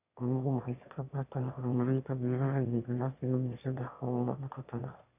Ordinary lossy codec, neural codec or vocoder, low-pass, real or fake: none; autoencoder, 22.05 kHz, a latent of 192 numbers a frame, VITS, trained on one speaker; 3.6 kHz; fake